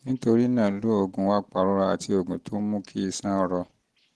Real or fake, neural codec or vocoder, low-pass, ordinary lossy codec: real; none; 10.8 kHz; Opus, 16 kbps